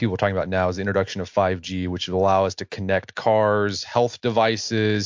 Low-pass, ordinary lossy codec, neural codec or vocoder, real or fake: 7.2 kHz; MP3, 48 kbps; none; real